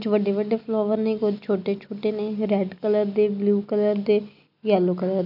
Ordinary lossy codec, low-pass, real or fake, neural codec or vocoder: none; 5.4 kHz; real; none